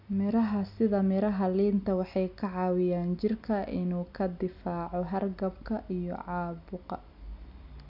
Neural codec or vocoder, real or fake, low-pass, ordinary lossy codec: none; real; 5.4 kHz; none